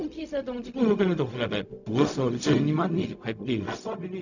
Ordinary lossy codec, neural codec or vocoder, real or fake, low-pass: none; codec, 16 kHz, 0.4 kbps, LongCat-Audio-Codec; fake; 7.2 kHz